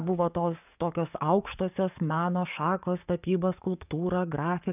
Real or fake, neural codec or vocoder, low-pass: fake; codec, 44.1 kHz, 7.8 kbps, DAC; 3.6 kHz